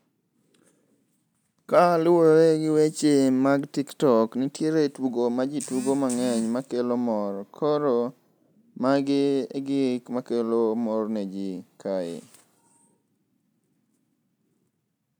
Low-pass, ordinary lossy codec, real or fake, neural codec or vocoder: none; none; real; none